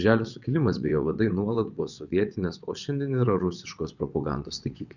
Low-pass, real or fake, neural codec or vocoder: 7.2 kHz; fake; vocoder, 22.05 kHz, 80 mel bands, WaveNeXt